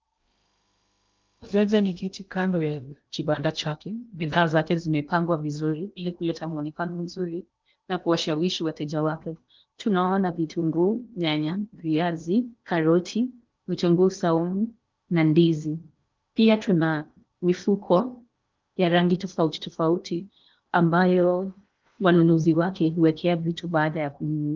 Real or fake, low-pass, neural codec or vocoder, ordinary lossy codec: fake; 7.2 kHz; codec, 16 kHz in and 24 kHz out, 0.8 kbps, FocalCodec, streaming, 65536 codes; Opus, 24 kbps